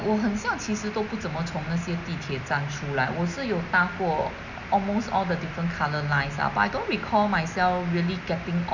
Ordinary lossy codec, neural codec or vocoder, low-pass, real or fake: none; none; 7.2 kHz; real